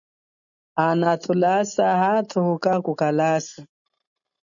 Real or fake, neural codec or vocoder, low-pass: real; none; 7.2 kHz